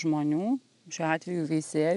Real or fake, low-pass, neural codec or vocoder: real; 10.8 kHz; none